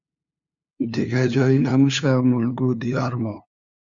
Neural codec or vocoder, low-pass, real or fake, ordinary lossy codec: codec, 16 kHz, 2 kbps, FunCodec, trained on LibriTTS, 25 frames a second; 7.2 kHz; fake; Opus, 64 kbps